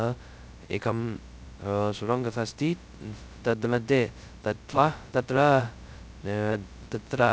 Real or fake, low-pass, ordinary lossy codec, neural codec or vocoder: fake; none; none; codec, 16 kHz, 0.2 kbps, FocalCodec